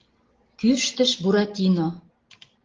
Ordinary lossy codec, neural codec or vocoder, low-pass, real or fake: Opus, 16 kbps; none; 7.2 kHz; real